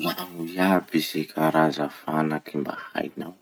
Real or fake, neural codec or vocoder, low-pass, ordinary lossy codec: real; none; none; none